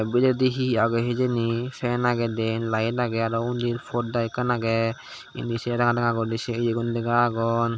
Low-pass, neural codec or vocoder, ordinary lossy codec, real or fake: none; none; none; real